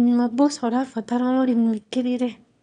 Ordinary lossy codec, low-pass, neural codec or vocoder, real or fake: none; 9.9 kHz; autoencoder, 22.05 kHz, a latent of 192 numbers a frame, VITS, trained on one speaker; fake